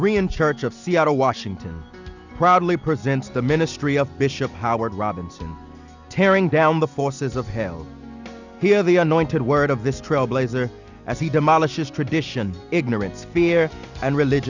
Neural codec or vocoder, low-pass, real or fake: none; 7.2 kHz; real